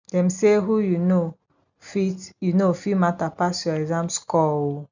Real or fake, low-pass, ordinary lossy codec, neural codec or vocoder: real; 7.2 kHz; none; none